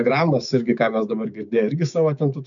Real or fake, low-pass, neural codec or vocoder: fake; 7.2 kHz; codec, 16 kHz, 6 kbps, DAC